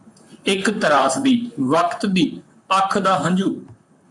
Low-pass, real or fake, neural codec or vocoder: 10.8 kHz; fake; vocoder, 44.1 kHz, 128 mel bands, Pupu-Vocoder